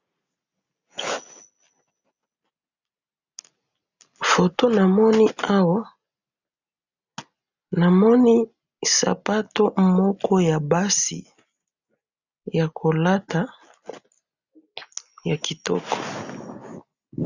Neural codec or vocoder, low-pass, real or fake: none; 7.2 kHz; real